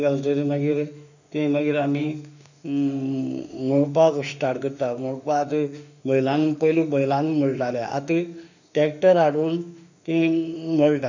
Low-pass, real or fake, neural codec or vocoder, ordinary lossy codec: 7.2 kHz; fake; autoencoder, 48 kHz, 32 numbers a frame, DAC-VAE, trained on Japanese speech; none